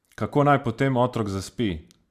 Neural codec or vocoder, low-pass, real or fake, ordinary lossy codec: none; 14.4 kHz; real; Opus, 64 kbps